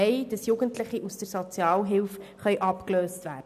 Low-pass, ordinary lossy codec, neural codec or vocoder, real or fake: 14.4 kHz; none; none; real